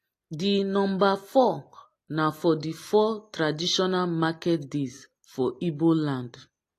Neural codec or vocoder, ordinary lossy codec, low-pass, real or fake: none; AAC, 48 kbps; 14.4 kHz; real